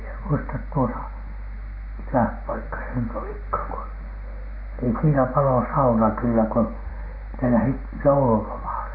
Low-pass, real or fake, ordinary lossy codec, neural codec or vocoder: 5.4 kHz; real; AAC, 32 kbps; none